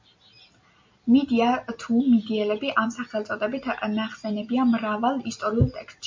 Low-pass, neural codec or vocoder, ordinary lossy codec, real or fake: 7.2 kHz; none; MP3, 48 kbps; real